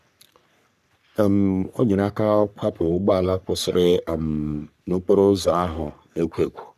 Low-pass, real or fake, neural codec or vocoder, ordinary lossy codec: 14.4 kHz; fake; codec, 44.1 kHz, 3.4 kbps, Pupu-Codec; none